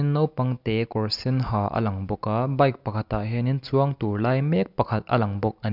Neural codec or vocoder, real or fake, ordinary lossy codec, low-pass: none; real; none; 5.4 kHz